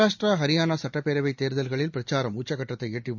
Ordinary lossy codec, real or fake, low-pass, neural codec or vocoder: none; real; 7.2 kHz; none